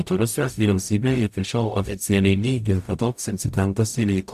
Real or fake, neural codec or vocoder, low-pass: fake; codec, 44.1 kHz, 0.9 kbps, DAC; 14.4 kHz